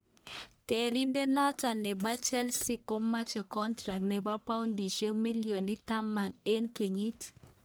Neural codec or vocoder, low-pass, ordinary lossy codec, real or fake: codec, 44.1 kHz, 1.7 kbps, Pupu-Codec; none; none; fake